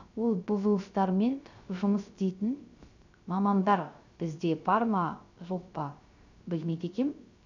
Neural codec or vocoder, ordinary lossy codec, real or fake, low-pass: codec, 16 kHz, 0.3 kbps, FocalCodec; none; fake; 7.2 kHz